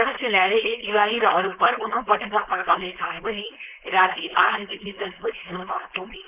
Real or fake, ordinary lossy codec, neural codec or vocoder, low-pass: fake; none; codec, 16 kHz, 4.8 kbps, FACodec; 3.6 kHz